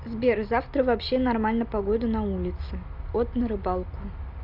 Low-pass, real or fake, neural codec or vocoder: 5.4 kHz; real; none